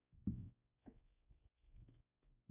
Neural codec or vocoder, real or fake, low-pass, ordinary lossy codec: codec, 16 kHz, 1 kbps, X-Codec, WavLM features, trained on Multilingual LibriSpeech; fake; 3.6 kHz; none